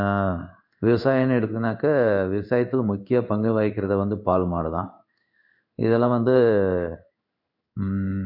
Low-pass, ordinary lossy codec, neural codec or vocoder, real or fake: 5.4 kHz; none; codec, 16 kHz in and 24 kHz out, 1 kbps, XY-Tokenizer; fake